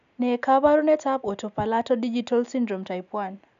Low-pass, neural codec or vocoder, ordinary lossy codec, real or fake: 7.2 kHz; none; none; real